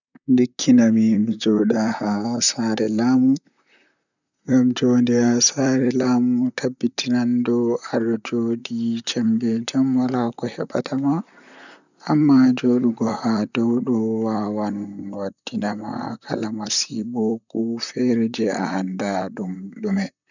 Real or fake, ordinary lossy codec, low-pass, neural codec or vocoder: fake; none; 7.2 kHz; vocoder, 44.1 kHz, 128 mel bands, Pupu-Vocoder